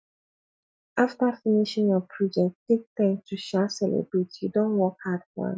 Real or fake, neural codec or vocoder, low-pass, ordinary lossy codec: real; none; none; none